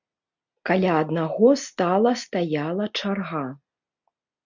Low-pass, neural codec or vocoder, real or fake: 7.2 kHz; none; real